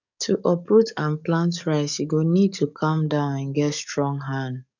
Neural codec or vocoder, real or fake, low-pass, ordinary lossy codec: codec, 44.1 kHz, 7.8 kbps, DAC; fake; 7.2 kHz; none